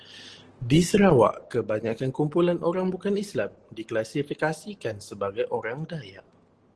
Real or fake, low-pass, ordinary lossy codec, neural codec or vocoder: real; 10.8 kHz; Opus, 16 kbps; none